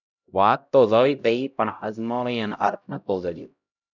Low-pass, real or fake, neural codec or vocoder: 7.2 kHz; fake; codec, 16 kHz, 0.5 kbps, X-Codec, HuBERT features, trained on LibriSpeech